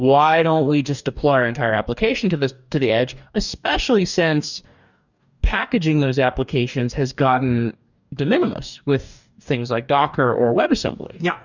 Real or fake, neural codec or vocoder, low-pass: fake; codec, 44.1 kHz, 2.6 kbps, DAC; 7.2 kHz